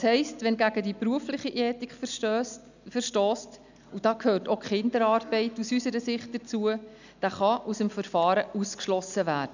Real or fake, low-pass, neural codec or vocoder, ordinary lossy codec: real; 7.2 kHz; none; none